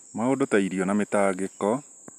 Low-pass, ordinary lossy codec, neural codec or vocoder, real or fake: 14.4 kHz; none; none; real